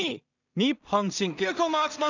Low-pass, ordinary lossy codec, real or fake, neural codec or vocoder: 7.2 kHz; none; fake; codec, 16 kHz in and 24 kHz out, 0.4 kbps, LongCat-Audio-Codec, two codebook decoder